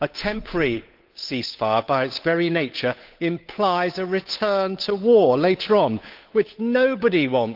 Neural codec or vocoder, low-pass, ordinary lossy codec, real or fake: codec, 16 kHz, 8 kbps, FunCodec, trained on Chinese and English, 25 frames a second; 5.4 kHz; Opus, 24 kbps; fake